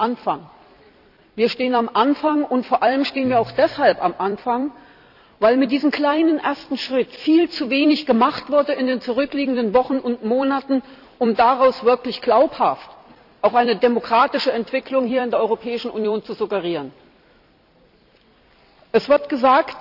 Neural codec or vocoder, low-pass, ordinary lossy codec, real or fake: vocoder, 44.1 kHz, 128 mel bands every 256 samples, BigVGAN v2; 5.4 kHz; none; fake